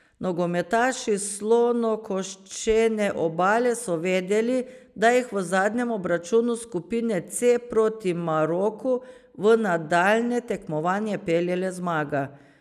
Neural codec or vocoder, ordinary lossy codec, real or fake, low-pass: none; none; real; 14.4 kHz